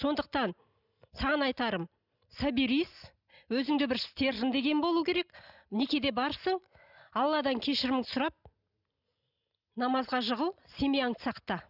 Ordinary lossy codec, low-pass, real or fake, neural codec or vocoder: none; 5.4 kHz; real; none